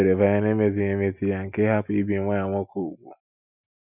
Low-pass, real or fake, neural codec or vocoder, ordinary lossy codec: 3.6 kHz; real; none; none